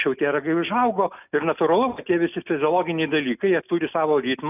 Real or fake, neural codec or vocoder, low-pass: real; none; 3.6 kHz